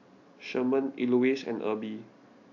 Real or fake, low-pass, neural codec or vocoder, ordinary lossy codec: real; 7.2 kHz; none; AAC, 48 kbps